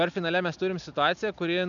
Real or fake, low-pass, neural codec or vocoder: real; 7.2 kHz; none